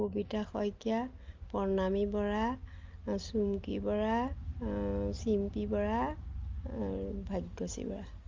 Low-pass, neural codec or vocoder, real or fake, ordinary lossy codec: 7.2 kHz; none; real; Opus, 32 kbps